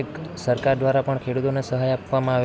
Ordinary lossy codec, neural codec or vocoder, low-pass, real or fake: none; none; none; real